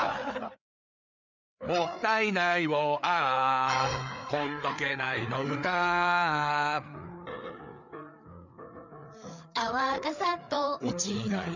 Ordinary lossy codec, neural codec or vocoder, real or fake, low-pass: none; codec, 16 kHz, 4 kbps, FreqCodec, larger model; fake; 7.2 kHz